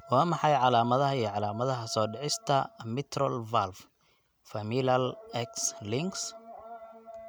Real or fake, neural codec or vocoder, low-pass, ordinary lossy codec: real; none; none; none